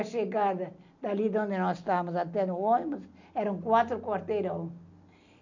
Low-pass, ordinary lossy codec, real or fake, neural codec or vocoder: 7.2 kHz; none; real; none